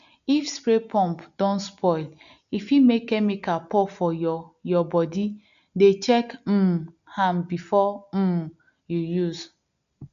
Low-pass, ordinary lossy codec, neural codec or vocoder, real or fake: 7.2 kHz; none; none; real